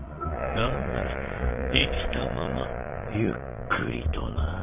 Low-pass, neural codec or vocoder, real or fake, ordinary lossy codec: 3.6 kHz; vocoder, 22.05 kHz, 80 mel bands, Vocos; fake; none